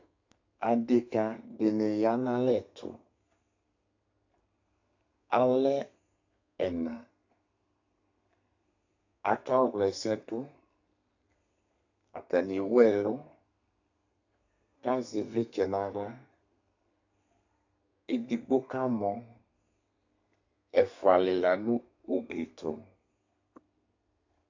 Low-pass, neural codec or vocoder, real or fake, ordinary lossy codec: 7.2 kHz; codec, 32 kHz, 1.9 kbps, SNAC; fake; AAC, 48 kbps